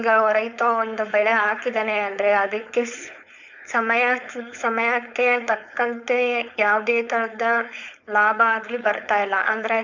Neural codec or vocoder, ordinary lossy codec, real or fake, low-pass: codec, 16 kHz, 4.8 kbps, FACodec; none; fake; 7.2 kHz